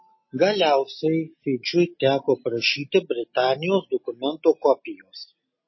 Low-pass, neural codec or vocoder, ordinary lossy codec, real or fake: 7.2 kHz; none; MP3, 24 kbps; real